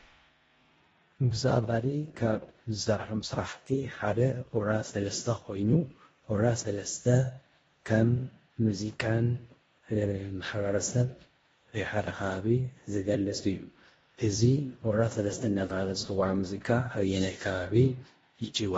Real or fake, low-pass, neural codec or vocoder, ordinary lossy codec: fake; 10.8 kHz; codec, 16 kHz in and 24 kHz out, 0.9 kbps, LongCat-Audio-Codec, four codebook decoder; AAC, 24 kbps